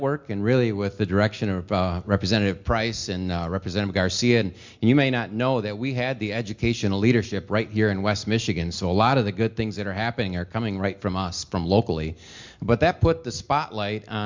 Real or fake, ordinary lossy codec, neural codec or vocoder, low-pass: real; MP3, 64 kbps; none; 7.2 kHz